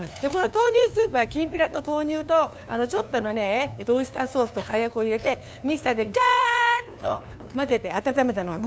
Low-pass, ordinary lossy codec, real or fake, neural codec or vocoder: none; none; fake; codec, 16 kHz, 2 kbps, FunCodec, trained on LibriTTS, 25 frames a second